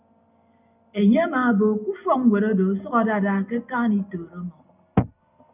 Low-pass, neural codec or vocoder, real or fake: 3.6 kHz; none; real